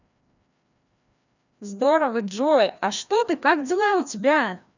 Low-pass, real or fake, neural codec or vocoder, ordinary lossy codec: 7.2 kHz; fake; codec, 16 kHz, 1 kbps, FreqCodec, larger model; none